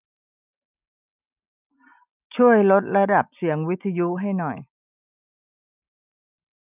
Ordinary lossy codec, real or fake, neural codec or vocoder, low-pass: none; real; none; 3.6 kHz